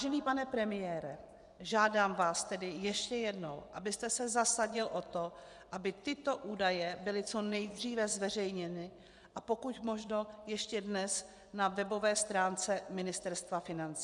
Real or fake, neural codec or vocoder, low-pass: fake; vocoder, 24 kHz, 100 mel bands, Vocos; 10.8 kHz